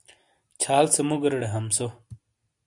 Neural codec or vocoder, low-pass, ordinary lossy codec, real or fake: none; 10.8 kHz; AAC, 64 kbps; real